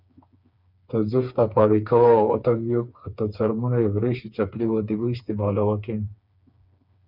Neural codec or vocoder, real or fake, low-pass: codec, 16 kHz, 4 kbps, FreqCodec, smaller model; fake; 5.4 kHz